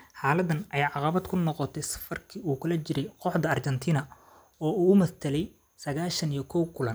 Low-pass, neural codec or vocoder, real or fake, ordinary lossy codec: none; none; real; none